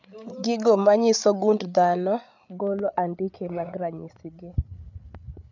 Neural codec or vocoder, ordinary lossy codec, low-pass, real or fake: codec, 16 kHz, 8 kbps, FreqCodec, larger model; none; 7.2 kHz; fake